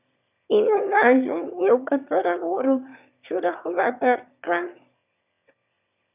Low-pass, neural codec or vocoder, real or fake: 3.6 kHz; autoencoder, 22.05 kHz, a latent of 192 numbers a frame, VITS, trained on one speaker; fake